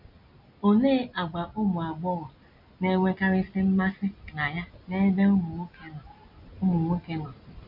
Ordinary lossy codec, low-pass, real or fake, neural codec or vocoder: MP3, 48 kbps; 5.4 kHz; real; none